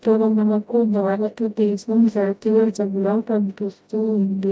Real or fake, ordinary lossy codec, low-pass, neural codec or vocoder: fake; none; none; codec, 16 kHz, 0.5 kbps, FreqCodec, smaller model